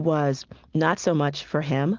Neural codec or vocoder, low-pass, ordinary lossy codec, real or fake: none; 7.2 kHz; Opus, 32 kbps; real